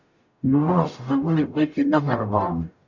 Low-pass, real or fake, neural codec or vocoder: 7.2 kHz; fake; codec, 44.1 kHz, 0.9 kbps, DAC